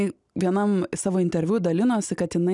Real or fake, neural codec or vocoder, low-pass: real; none; 10.8 kHz